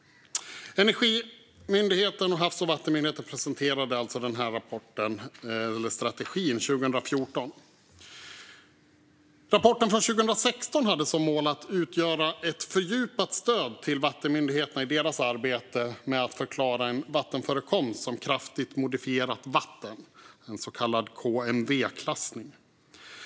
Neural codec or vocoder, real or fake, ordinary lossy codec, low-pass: none; real; none; none